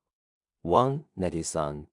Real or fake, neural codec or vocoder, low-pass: fake; codec, 16 kHz in and 24 kHz out, 0.4 kbps, LongCat-Audio-Codec, two codebook decoder; 10.8 kHz